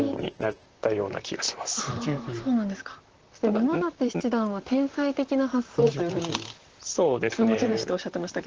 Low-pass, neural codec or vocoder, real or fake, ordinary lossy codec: 7.2 kHz; vocoder, 44.1 kHz, 128 mel bands, Pupu-Vocoder; fake; Opus, 16 kbps